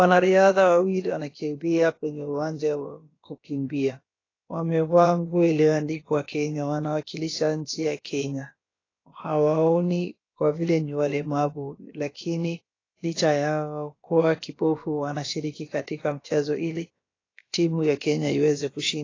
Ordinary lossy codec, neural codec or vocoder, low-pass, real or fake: AAC, 32 kbps; codec, 16 kHz, about 1 kbps, DyCAST, with the encoder's durations; 7.2 kHz; fake